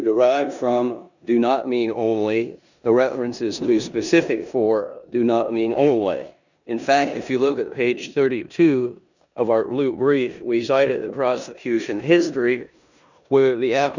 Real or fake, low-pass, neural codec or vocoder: fake; 7.2 kHz; codec, 16 kHz in and 24 kHz out, 0.9 kbps, LongCat-Audio-Codec, four codebook decoder